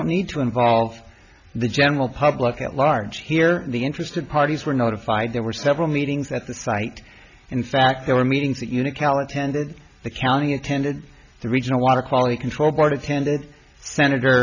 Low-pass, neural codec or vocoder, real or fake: 7.2 kHz; none; real